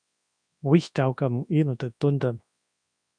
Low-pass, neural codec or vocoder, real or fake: 9.9 kHz; codec, 24 kHz, 0.9 kbps, WavTokenizer, large speech release; fake